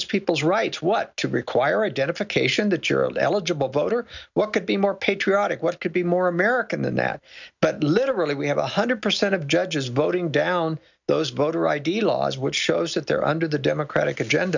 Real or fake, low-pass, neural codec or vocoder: real; 7.2 kHz; none